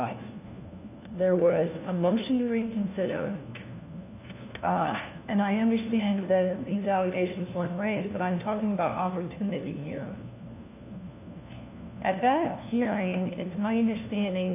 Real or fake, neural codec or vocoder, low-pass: fake; codec, 16 kHz, 1 kbps, FunCodec, trained on LibriTTS, 50 frames a second; 3.6 kHz